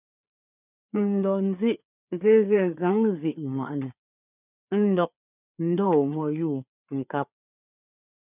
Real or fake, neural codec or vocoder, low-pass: fake; codec, 16 kHz, 4 kbps, FreqCodec, larger model; 3.6 kHz